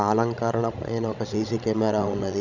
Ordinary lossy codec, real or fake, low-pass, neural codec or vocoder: none; fake; 7.2 kHz; codec, 16 kHz, 16 kbps, FreqCodec, larger model